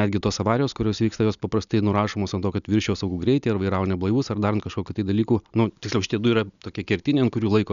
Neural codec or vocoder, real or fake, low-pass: none; real; 7.2 kHz